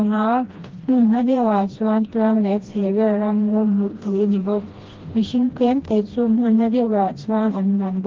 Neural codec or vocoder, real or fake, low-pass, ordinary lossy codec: codec, 16 kHz, 1 kbps, FreqCodec, smaller model; fake; 7.2 kHz; Opus, 16 kbps